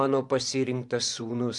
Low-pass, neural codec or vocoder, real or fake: 10.8 kHz; vocoder, 24 kHz, 100 mel bands, Vocos; fake